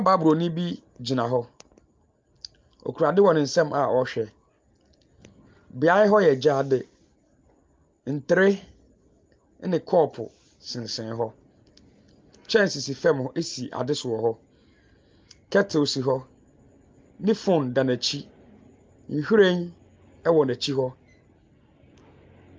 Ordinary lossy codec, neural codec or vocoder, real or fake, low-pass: Opus, 24 kbps; none; real; 7.2 kHz